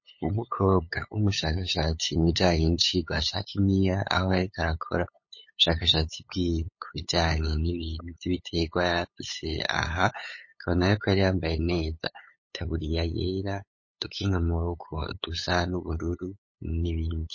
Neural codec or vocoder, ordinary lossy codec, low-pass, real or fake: codec, 16 kHz, 8 kbps, FunCodec, trained on LibriTTS, 25 frames a second; MP3, 32 kbps; 7.2 kHz; fake